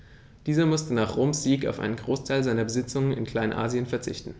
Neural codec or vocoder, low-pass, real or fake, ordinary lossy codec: none; none; real; none